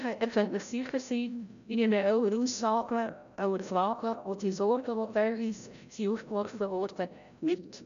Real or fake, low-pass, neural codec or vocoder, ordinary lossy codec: fake; 7.2 kHz; codec, 16 kHz, 0.5 kbps, FreqCodec, larger model; none